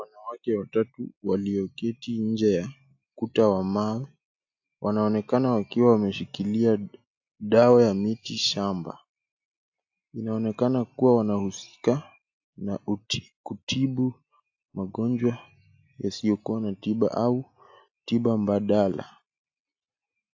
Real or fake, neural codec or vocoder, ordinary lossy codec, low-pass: real; none; AAC, 48 kbps; 7.2 kHz